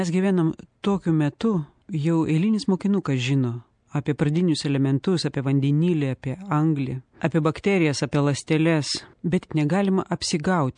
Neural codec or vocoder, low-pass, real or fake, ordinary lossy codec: none; 9.9 kHz; real; MP3, 64 kbps